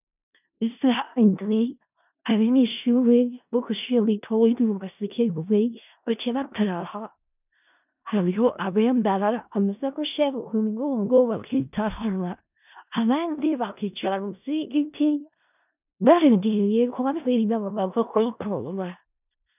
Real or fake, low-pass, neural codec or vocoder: fake; 3.6 kHz; codec, 16 kHz in and 24 kHz out, 0.4 kbps, LongCat-Audio-Codec, four codebook decoder